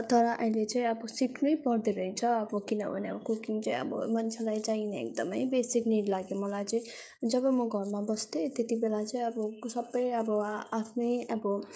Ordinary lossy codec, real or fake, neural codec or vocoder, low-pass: none; fake; codec, 16 kHz, 16 kbps, FreqCodec, smaller model; none